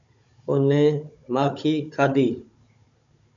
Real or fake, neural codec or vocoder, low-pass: fake; codec, 16 kHz, 16 kbps, FunCodec, trained on Chinese and English, 50 frames a second; 7.2 kHz